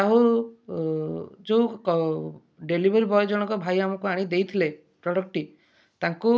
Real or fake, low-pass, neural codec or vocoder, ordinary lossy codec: real; none; none; none